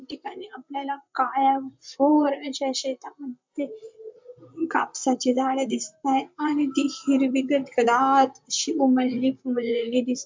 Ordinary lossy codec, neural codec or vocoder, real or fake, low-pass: MP3, 64 kbps; vocoder, 44.1 kHz, 128 mel bands, Pupu-Vocoder; fake; 7.2 kHz